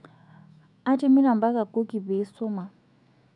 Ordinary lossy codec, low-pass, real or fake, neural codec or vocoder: none; 10.8 kHz; fake; autoencoder, 48 kHz, 128 numbers a frame, DAC-VAE, trained on Japanese speech